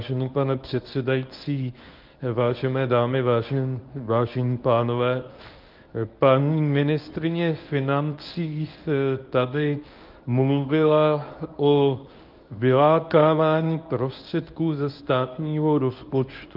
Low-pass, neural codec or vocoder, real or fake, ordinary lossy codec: 5.4 kHz; codec, 24 kHz, 0.9 kbps, WavTokenizer, medium speech release version 1; fake; Opus, 24 kbps